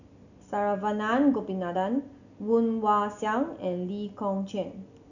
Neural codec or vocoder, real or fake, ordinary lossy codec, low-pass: none; real; none; 7.2 kHz